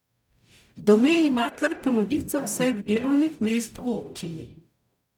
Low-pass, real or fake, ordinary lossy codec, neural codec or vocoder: 19.8 kHz; fake; none; codec, 44.1 kHz, 0.9 kbps, DAC